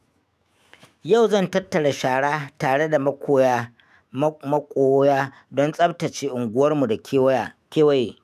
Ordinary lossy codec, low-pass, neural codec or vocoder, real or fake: none; 14.4 kHz; autoencoder, 48 kHz, 128 numbers a frame, DAC-VAE, trained on Japanese speech; fake